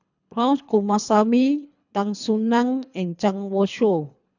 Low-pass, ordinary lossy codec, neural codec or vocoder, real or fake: 7.2 kHz; none; codec, 24 kHz, 3 kbps, HILCodec; fake